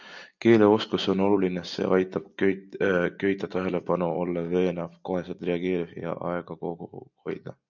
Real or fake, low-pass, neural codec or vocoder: real; 7.2 kHz; none